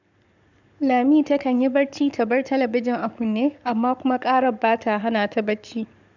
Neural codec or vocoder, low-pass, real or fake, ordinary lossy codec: codec, 44.1 kHz, 7.8 kbps, Pupu-Codec; 7.2 kHz; fake; none